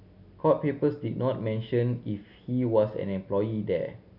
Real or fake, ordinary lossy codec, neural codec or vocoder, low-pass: real; none; none; 5.4 kHz